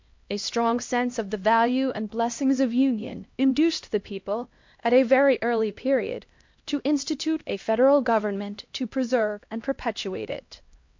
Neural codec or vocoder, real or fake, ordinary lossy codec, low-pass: codec, 16 kHz, 1 kbps, X-Codec, HuBERT features, trained on LibriSpeech; fake; MP3, 48 kbps; 7.2 kHz